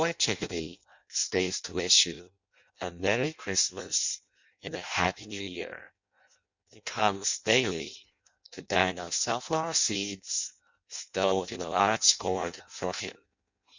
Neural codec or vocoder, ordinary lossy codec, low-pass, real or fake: codec, 16 kHz in and 24 kHz out, 0.6 kbps, FireRedTTS-2 codec; Opus, 64 kbps; 7.2 kHz; fake